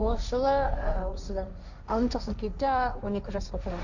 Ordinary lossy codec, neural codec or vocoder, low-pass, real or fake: none; codec, 16 kHz, 1.1 kbps, Voila-Tokenizer; 7.2 kHz; fake